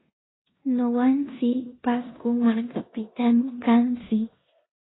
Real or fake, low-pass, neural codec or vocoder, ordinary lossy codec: fake; 7.2 kHz; codec, 16 kHz in and 24 kHz out, 0.9 kbps, LongCat-Audio-Codec, four codebook decoder; AAC, 16 kbps